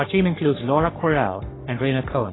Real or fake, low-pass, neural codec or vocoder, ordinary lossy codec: fake; 7.2 kHz; codec, 44.1 kHz, 3.4 kbps, Pupu-Codec; AAC, 16 kbps